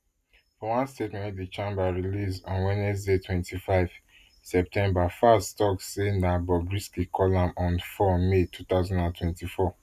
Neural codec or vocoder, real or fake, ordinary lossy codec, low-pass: none; real; none; 14.4 kHz